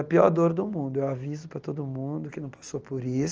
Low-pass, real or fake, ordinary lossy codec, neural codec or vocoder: 7.2 kHz; real; Opus, 24 kbps; none